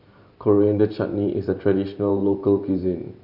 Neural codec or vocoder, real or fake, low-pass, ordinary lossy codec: vocoder, 44.1 kHz, 128 mel bands every 512 samples, BigVGAN v2; fake; 5.4 kHz; none